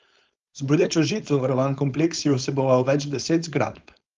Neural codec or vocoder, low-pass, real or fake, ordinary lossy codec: codec, 16 kHz, 4.8 kbps, FACodec; 7.2 kHz; fake; Opus, 32 kbps